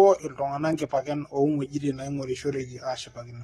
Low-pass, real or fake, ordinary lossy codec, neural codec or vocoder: 19.8 kHz; fake; AAC, 32 kbps; codec, 44.1 kHz, 7.8 kbps, Pupu-Codec